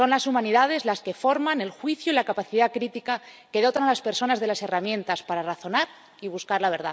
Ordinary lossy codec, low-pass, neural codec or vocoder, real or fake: none; none; none; real